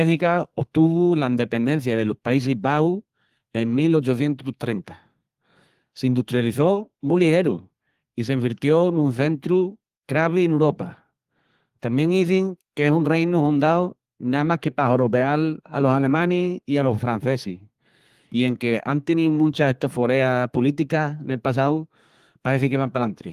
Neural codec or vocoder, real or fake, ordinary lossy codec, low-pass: codec, 32 kHz, 1.9 kbps, SNAC; fake; Opus, 24 kbps; 14.4 kHz